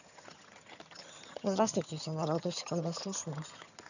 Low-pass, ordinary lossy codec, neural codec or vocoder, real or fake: 7.2 kHz; none; vocoder, 22.05 kHz, 80 mel bands, HiFi-GAN; fake